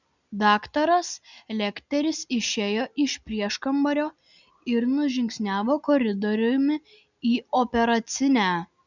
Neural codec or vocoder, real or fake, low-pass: none; real; 7.2 kHz